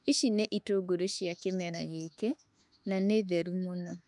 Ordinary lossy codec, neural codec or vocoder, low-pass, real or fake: none; autoencoder, 48 kHz, 32 numbers a frame, DAC-VAE, trained on Japanese speech; 10.8 kHz; fake